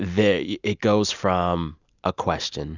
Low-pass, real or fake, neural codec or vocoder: 7.2 kHz; real; none